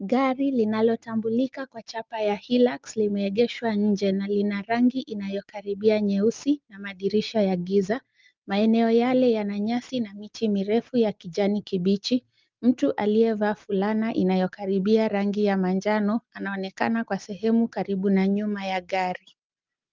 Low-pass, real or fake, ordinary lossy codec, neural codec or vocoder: 7.2 kHz; real; Opus, 24 kbps; none